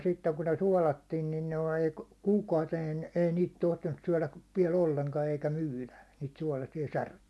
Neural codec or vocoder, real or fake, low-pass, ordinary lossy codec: none; real; none; none